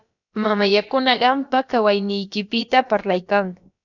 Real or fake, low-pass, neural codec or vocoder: fake; 7.2 kHz; codec, 16 kHz, about 1 kbps, DyCAST, with the encoder's durations